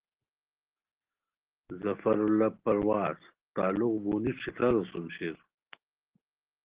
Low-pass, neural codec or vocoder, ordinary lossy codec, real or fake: 3.6 kHz; none; Opus, 16 kbps; real